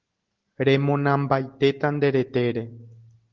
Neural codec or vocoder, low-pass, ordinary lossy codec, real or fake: none; 7.2 kHz; Opus, 16 kbps; real